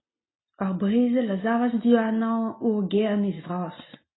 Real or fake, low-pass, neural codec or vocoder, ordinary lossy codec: real; 7.2 kHz; none; AAC, 16 kbps